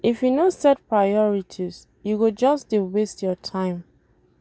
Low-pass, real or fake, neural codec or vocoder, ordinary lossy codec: none; real; none; none